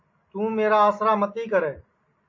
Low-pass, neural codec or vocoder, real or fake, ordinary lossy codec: 7.2 kHz; none; real; MP3, 32 kbps